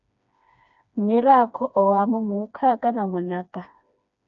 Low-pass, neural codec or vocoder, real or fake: 7.2 kHz; codec, 16 kHz, 2 kbps, FreqCodec, smaller model; fake